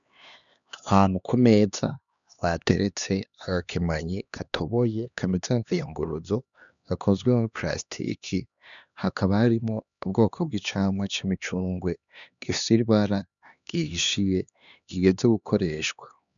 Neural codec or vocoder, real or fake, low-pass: codec, 16 kHz, 2 kbps, X-Codec, HuBERT features, trained on LibriSpeech; fake; 7.2 kHz